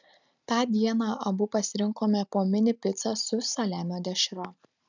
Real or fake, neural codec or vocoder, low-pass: real; none; 7.2 kHz